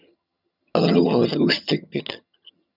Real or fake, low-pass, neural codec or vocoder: fake; 5.4 kHz; vocoder, 22.05 kHz, 80 mel bands, HiFi-GAN